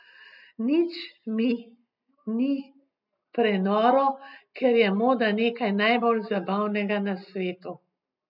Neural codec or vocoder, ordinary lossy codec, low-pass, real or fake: none; none; 5.4 kHz; real